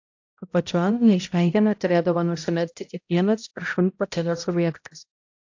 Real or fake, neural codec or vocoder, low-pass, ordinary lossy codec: fake; codec, 16 kHz, 0.5 kbps, X-Codec, HuBERT features, trained on balanced general audio; 7.2 kHz; AAC, 48 kbps